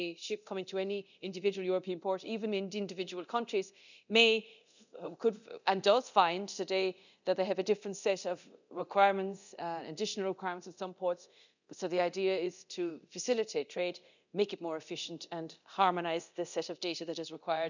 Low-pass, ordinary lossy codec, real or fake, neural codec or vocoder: 7.2 kHz; none; fake; codec, 24 kHz, 0.9 kbps, DualCodec